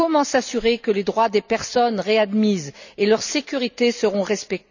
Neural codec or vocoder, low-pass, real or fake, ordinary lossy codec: none; 7.2 kHz; real; none